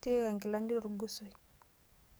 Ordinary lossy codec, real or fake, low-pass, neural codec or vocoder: none; fake; none; codec, 44.1 kHz, 7.8 kbps, DAC